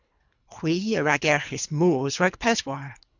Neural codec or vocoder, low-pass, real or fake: codec, 24 kHz, 3 kbps, HILCodec; 7.2 kHz; fake